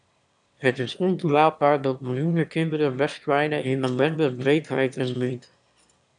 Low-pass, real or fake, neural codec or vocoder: 9.9 kHz; fake; autoencoder, 22.05 kHz, a latent of 192 numbers a frame, VITS, trained on one speaker